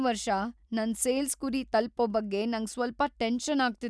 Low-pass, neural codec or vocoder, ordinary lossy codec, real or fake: none; none; none; real